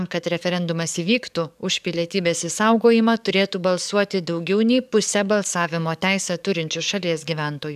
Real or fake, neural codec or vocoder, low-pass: fake; codec, 44.1 kHz, 7.8 kbps, DAC; 14.4 kHz